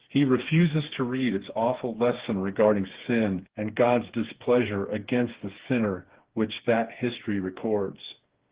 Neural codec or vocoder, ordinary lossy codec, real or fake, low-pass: codec, 16 kHz, 4 kbps, FreqCodec, smaller model; Opus, 16 kbps; fake; 3.6 kHz